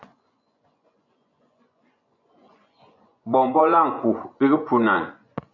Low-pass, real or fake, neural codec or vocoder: 7.2 kHz; fake; vocoder, 24 kHz, 100 mel bands, Vocos